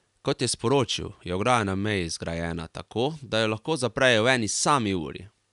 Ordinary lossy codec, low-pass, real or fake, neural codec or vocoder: none; 10.8 kHz; real; none